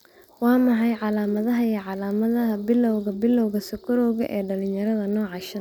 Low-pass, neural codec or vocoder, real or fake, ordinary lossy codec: none; none; real; none